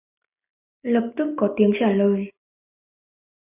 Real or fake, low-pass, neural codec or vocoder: real; 3.6 kHz; none